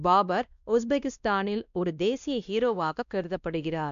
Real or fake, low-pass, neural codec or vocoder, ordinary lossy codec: fake; 7.2 kHz; codec, 16 kHz, 1 kbps, X-Codec, WavLM features, trained on Multilingual LibriSpeech; none